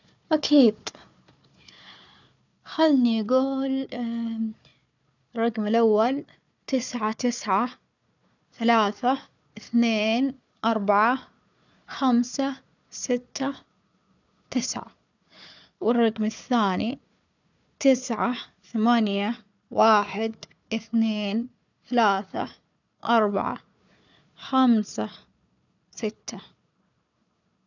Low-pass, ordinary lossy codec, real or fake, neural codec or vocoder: 7.2 kHz; none; fake; codec, 16 kHz, 4 kbps, FunCodec, trained on Chinese and English, 50 frames a second